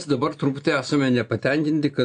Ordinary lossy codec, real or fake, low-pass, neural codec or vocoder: MP3, 64 kbps; real; 9.9 kHz; none